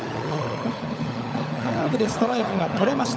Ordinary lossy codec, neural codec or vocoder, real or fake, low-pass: none; codec, 16 kHz, 16 kbps, FunCodec, trained on LibriTTS, 50 frames a second; fake; none